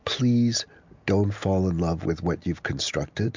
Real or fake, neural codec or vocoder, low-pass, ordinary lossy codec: real; none; 7.2 kHz; MP3, 64 kbps